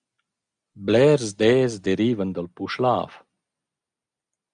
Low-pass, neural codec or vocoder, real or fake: 9.9 kHz; none; real